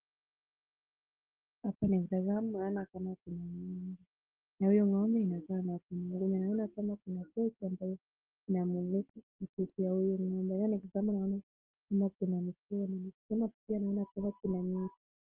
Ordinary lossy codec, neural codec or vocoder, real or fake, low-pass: Opus, 16 kbps; none; real; 3.6 kHz